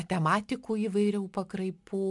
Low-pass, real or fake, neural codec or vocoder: 10.8 kHz; real; none